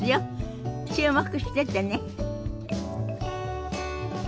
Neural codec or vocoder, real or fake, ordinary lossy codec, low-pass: none; real; none; none